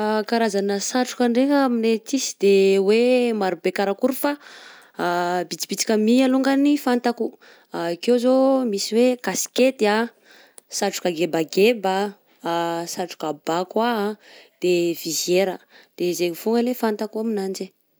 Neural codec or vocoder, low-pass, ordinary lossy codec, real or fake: none; none; none; real